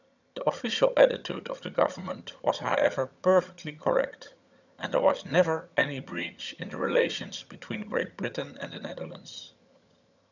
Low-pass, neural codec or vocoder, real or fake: 7.2 kHz; vocoder, 22.05 kHz, 80 mel bands, HiFi-GAN; fake